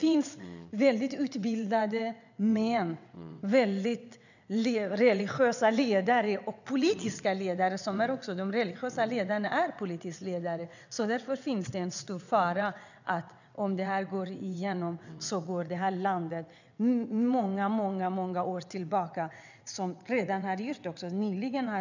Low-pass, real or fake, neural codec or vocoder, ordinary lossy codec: 7.2 kHz; fake; vocoder, 22.05 kHz, 80 mel bands, WaveNeXt; none